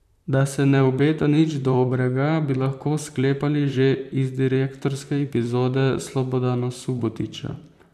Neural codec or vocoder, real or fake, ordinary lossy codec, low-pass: vocoder, 44.1 kHz, 128 mel bands, Pupu-Vocoder; fake; none; 14.4 kHz